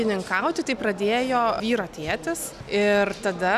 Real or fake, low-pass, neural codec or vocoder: real; 14.4 kHz; none